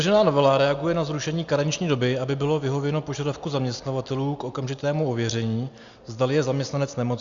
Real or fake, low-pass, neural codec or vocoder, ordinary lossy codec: real; 7.2 kHz; none; Opus, 64 kbps